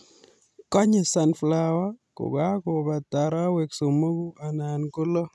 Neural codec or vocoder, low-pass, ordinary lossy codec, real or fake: none; none; none; real